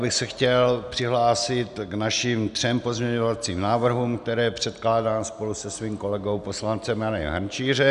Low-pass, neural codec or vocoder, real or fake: 10.8 kHz; none; real